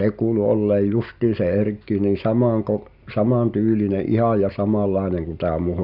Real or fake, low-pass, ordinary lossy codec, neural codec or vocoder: real; 5.4 kHz; none; none